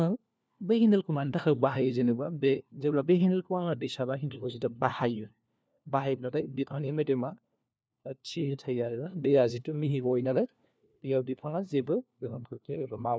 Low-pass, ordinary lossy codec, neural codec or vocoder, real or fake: none; none; codec, 16 kHz, 1 kbps, FunCodec, trained on LibriTTS, 50 frames a second; fake